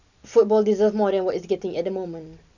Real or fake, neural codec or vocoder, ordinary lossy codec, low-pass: real; none; none; 7.2 kHz